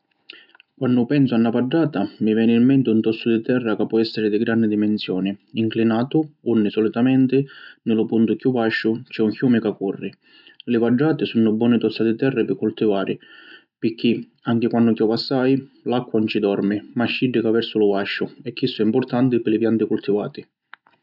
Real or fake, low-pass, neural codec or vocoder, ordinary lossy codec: real; 5.4 kHz; none; none